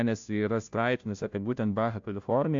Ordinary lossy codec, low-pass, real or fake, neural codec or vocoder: AAC, 64 kbps; 7.2 kHz; fake; codec, 16 kHz, 0.5 kbps, FunCodec, trained on Chinese and English, 25 frames a second